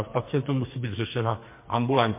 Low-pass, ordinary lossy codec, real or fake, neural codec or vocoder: 3.6 kHz; MP3, 24 kbps; fake; codec, 44.1 kHz, 2.6 kbps, SNAC